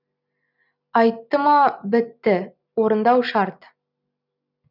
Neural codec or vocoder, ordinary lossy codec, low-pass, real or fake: none; none; 5.4 kHz; real